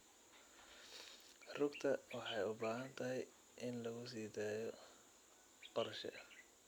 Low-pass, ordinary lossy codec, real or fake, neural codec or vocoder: none; none; real; none